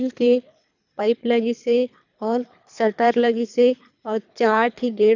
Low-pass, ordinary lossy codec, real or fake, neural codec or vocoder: 7.2 kHz; none; fake; codec, 24 kHz, 3 kbps, HILCodec